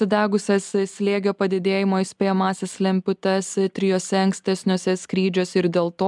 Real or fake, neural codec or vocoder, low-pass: real; none; 10.8 kHz